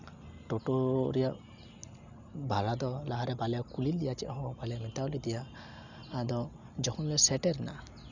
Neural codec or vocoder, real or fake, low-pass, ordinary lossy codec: none; real; 7.2 kHz; none